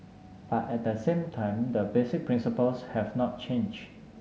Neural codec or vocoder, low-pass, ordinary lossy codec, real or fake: none; none; none; real